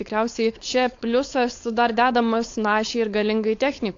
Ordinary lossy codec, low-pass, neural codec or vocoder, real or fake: AAC, 48 kbps; 7.2 kHz; codec, 16 kHz, 4.8 kbps, FACodec; fake